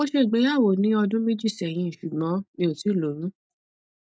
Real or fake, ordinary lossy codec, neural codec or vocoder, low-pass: real; none; none; none